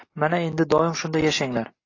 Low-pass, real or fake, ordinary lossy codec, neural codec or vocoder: 7.2 kHz; real; AAC, 32 kbps; none